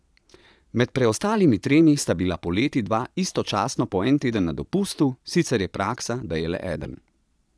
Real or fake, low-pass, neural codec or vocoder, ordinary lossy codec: fake; none; vocoder, 22.05 kHz, 80 mel bands, Vocos; none